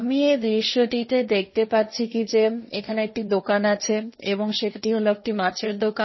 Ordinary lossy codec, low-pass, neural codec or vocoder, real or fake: MP3, 24 kbps; 7.2 kHz; codec, 16 kHz, 1.1 kbps, Voila-Tokenizer; fake